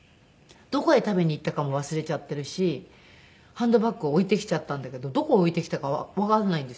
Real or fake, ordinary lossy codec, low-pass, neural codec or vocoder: real; none; none; none